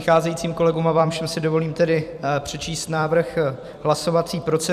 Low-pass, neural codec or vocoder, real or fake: 14.4 kHz; vocoder, 44.1 kHz, 128 mel bands every 256 samples, BigVGAN v2; fake